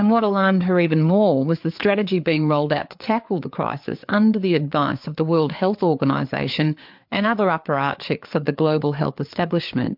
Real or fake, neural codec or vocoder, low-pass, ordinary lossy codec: fake; codec, 16 kHz, 4 kbps, FreqCodec, larger model; 5.4 kHz; MP3, 48 kbps